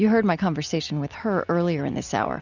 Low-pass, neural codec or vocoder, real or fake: 7.2 kHz; none; real